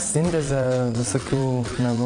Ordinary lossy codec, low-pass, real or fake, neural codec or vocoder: AAC, 48 kbps; 9.9 kHz; fake; vocoder, 22.05 kHz, 80 mel bands, Vocos